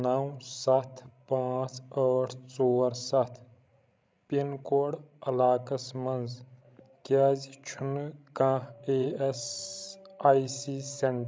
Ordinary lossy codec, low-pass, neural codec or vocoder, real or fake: none; none; codec, 16 kHz, 16 kbps, FreqCodec, larger model; fake